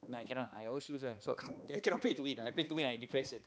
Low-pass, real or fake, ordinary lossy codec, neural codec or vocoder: none; fake; none; codec, 16 kHz, 2 kbps, X-Codec, HuBERT features, trained on balanced general audio